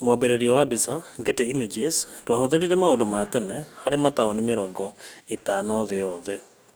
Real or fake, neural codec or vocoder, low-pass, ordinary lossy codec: fake; codec, 44.1 kHz, 2.6 kbps, DAC; none; none